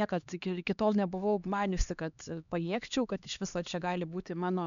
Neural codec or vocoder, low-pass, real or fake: codec, 16 kHz, 2 kbps, X-Codec, HuBERT features, trained on LibriSpeech; 7.2 kHz; fake